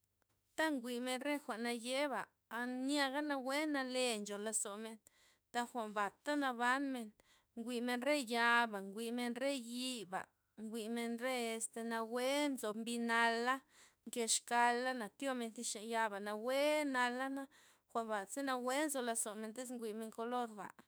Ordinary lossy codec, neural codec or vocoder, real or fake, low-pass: none; autoencoder, 48 kHz, 32 numbers a frame, DAC-VAE, trained on Japanese speech; fake; none